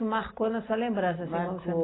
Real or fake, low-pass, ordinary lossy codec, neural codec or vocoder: real; 7.2 kHz; AAC, 16 kbps; none